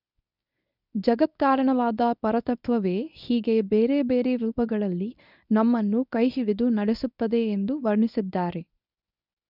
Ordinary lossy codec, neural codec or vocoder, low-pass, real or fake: none; codec, 24 kHz, 0.9 kbps, WavTokenizer, medium speech release version 1; 5.4 kHz; fake